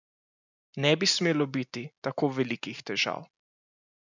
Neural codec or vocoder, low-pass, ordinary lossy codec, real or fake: none; 7.2 kHz; none; real